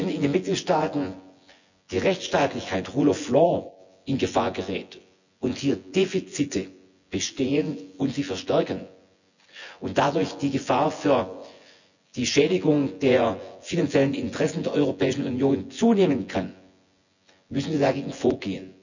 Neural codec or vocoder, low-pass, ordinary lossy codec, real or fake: vocoder, 24 kHz, 100 mel bands, Vocos; 7.2 kHz; none; fake